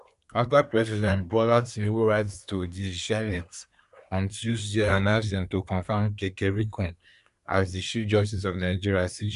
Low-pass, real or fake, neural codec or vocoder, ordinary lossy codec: 10.8 kHz; fake; codec, 24 kHz, 1 kbps, SNAC; none